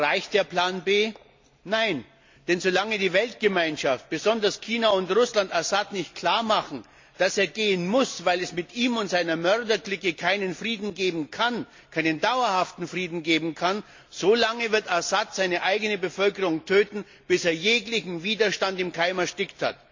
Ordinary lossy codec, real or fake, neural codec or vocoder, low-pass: MP3, 48 kbps; real; none; 7.2 kHz